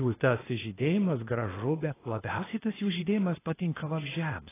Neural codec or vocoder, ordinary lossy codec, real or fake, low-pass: codec, 16 kHz, 0.8 kbps, ZipCodec; AAC, 16 kbps; fake; 3.6 kHz